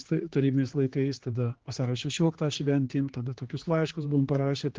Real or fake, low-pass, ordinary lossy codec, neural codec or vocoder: fake; 7.2 kHz; Opus, 16 kbps; codec, 16 kHz, 2 kbps, X-Codec, HuBERT features, trained on general audio